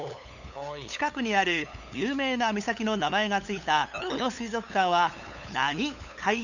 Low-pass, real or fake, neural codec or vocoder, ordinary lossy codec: 7.2 kHz; fake; codec, 16 kHz, 8 kbps, FunCodec, trained on LibriTTS, 25 frames a second; none